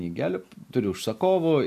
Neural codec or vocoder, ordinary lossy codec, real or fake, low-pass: none; AAC, 96 kbps; real; 14.4 kHz